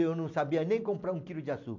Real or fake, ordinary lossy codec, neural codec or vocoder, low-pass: real; MP3, 64 kbps; none; 7.2 kHz